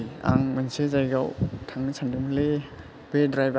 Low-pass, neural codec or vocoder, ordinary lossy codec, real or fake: none; none; none; real